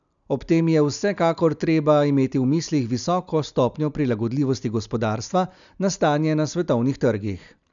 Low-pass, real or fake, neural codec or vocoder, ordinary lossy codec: 7.2 kHz; real; none; none